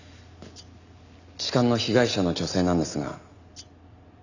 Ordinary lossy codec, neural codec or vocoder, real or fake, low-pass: none; none; real; 7.2 kHz